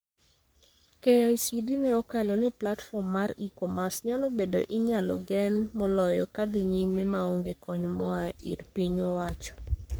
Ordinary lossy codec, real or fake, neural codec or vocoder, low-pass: none; fake; codec, 44.1 kHz, 3.4 kbps, Pupu-Codec; none